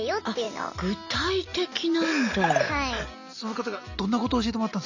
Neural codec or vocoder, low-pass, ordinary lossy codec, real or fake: none; 7.2 kHz; none; real